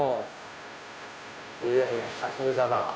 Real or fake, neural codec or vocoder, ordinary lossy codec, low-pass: fake; codec, 16 kHz, 0.5 kbps, FunCodec, trained on Chinese and English, 25 frames a second; none; none